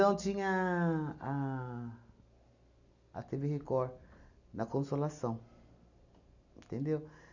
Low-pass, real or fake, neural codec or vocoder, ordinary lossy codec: 7.2 kHz; real; none; none